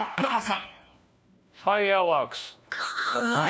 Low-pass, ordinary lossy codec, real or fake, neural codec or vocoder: none; none; fake; codec, 16 kHz, 1 kbps, FunCodec, trained on LibriTTS, 50 frames a second